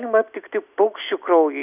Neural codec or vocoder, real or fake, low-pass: none; real; 3.6 kHz